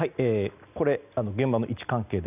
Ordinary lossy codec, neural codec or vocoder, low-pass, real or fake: none; none; 3.6 kHz; real